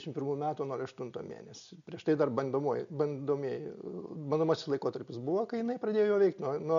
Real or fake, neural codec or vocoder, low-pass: real; none; 7.2 kHz